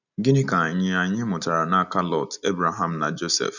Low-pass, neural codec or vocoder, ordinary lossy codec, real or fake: 7.2 kHz; none; none; real